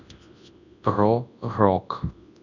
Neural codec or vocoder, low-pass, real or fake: codec, 24 kHz, 0.9 kbps, WavTokenizer, large speech release; 7.2 kHz; fake